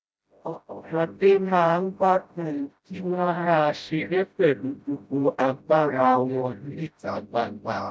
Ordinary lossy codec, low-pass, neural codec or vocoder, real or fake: none; none; codec, 16 kHz, 0.5 kbps, FreqCodec, smaller model; fake